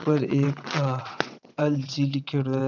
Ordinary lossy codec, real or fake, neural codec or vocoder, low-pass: none; fake; vocoder, 22.05 kHz, 80 mel bands, WaveNeXt; 7.2 kHz